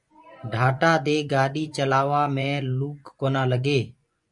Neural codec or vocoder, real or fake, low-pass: vocoder, 24 kHz, 100 mel bands, Vocos; fake; 10.8 kHz